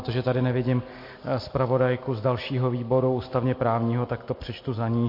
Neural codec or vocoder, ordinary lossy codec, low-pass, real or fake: none; MP3, 32 kbps; 5.4 kHz; real